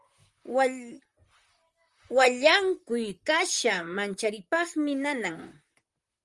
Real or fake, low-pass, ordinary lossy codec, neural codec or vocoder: fake; 10.8 kHz; Opus, 32 kbps; vocoder, 44.1 kHz, 128 mel bands, Pupu-Vocoder